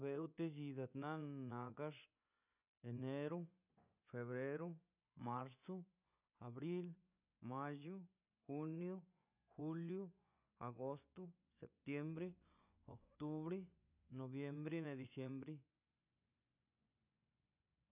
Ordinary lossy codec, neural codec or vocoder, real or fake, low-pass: none; vocoder, 24 kHz, 100 mel bands, Vocos; fake; 3.6 kHz